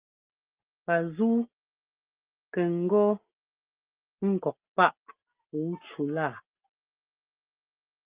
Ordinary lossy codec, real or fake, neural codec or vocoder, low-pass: Opus, 32 kbps; real; none; 3.6 kHz